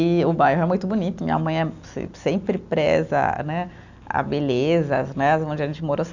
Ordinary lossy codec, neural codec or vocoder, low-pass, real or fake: none; none; 7.2 kHz; real